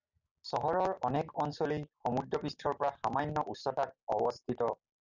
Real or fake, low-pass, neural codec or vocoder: real; 7.2 kHz; none